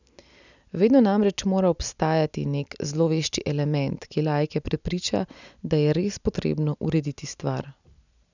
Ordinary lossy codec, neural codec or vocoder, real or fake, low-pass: none; none; real; 7.2 kHz